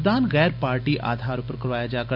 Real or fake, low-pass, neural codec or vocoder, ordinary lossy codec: real; 5.4 kHz; none; none